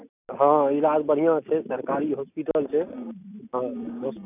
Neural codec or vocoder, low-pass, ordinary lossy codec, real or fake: none; 3.6 kHz; none; real